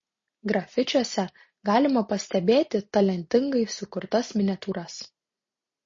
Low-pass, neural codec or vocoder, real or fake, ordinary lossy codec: 7.2 kHz; none; real; MP3, 32 kbps